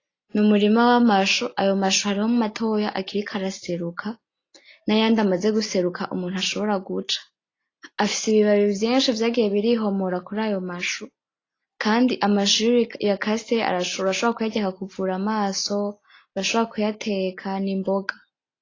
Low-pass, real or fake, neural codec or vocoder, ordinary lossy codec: 7.2 kHz; real; none; AAC, 32 kbps